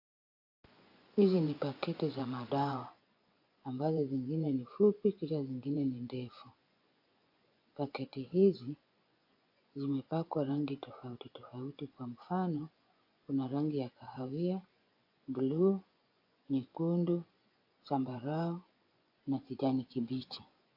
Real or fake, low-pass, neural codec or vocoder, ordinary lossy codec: fake; 5.4 kHz; vocoder, 24 kHz, 100 mel bands, Vocos; AAC, 32 kbps